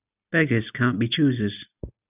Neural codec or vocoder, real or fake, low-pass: none; real; 3.6 kHz